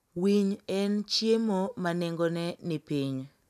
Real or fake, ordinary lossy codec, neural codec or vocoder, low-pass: real; none; none; 14.4 kHz